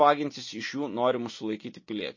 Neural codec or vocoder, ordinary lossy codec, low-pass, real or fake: none; MP3, 32 kbps; 7.2 kHz; real